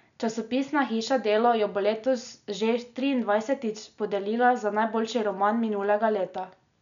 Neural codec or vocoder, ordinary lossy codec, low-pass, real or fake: none; none; 7.2 kHz; real